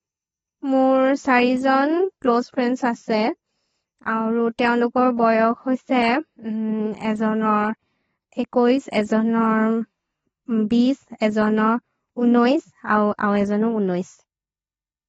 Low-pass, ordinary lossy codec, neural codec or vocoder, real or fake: 19.8 kHz; AAC, 24 kbps; none; real